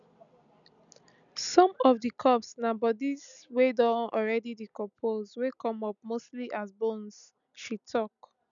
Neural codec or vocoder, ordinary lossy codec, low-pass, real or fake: none; none; 7.2 kHz; real